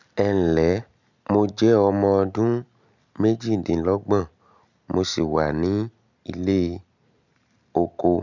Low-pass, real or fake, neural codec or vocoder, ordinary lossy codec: 7.2 kHz; real; none; none